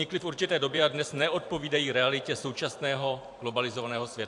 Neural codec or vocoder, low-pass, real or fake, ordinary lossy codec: vocoder, 44.1 kHz, 128 mel bands every 512 samples, BigVGAN v2; 10.8 kHz; fake; AAC, 64 kbps